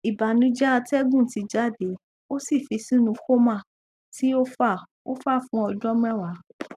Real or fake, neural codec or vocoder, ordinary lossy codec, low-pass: real; none; none; 14.4 kHz